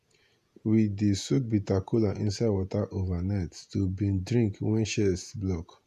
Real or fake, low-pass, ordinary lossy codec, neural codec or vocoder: fake; 14.4 kHz; none; vocoder, 48 kHz, 128 mel bands, Vocos